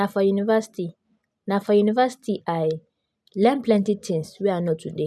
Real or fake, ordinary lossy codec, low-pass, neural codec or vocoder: real; none; none; none